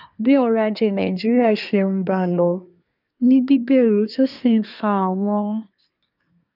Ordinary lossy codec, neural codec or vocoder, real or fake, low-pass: none; codec, 24 kHz, 1 kbps, SNAC; fake; 5.4 kHz